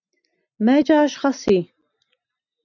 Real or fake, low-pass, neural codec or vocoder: real; 7.2 kHz; none